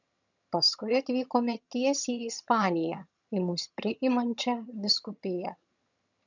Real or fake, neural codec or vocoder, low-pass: fake; vocoder, 22.05 kHz, 80 mel bands, HiFi-GAN; 7.2 kHz